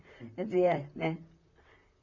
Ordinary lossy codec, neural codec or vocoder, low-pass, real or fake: none; vocoder, 44.1 kHz, 128 mel bands, Pupu-Vocoder; 7.2 kHz; fake